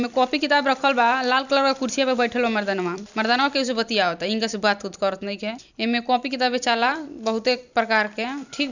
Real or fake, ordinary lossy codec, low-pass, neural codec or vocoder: fake; none; 7.2 kHz; vocoder, 44.1 kHz, 128 mel bands every 256 samples, BigVGAN v2